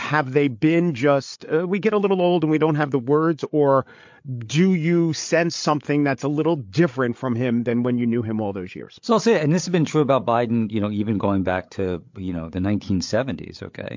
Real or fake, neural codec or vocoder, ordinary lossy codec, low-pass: fake; codec, 16 kHz, 8 kbps, FreqCodec, larger model; MP3, 48 kbps; 7.2 kHz